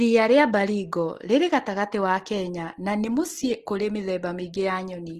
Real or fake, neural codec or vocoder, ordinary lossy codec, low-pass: real; none; Opus, 16 kbps; 14.4 kHz